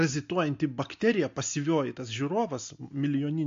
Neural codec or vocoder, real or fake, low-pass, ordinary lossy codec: none; real; 7.2 kHz; MP3, 48 kbps